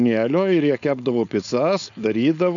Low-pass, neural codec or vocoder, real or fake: 7.2 kHz; codec, 16 kHz, 4.8 kbps, FACodec; fake